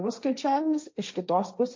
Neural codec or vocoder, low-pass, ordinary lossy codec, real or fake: codec, 16 kHz, 1.1 kbps, Voila-Tokenizer; 7.2 kHz; MP3, 48 kbps; fake